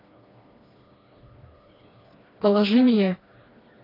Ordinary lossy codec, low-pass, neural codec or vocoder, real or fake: AAC, 24 kbps; 5.4 kHz; codec, 16 kHz, 2 kbps, FreqCodec, smaller model; fake